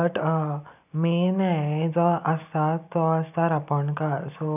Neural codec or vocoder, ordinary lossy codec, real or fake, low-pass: none; none; real; 3.6 kHz